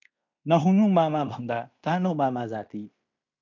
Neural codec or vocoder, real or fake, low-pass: codec, 16 kHz in and 24 kHz out, 0.9 kbps, LongCat-Audio-Codec, fine tuned four codebook decoder; fake; 7.2 kHz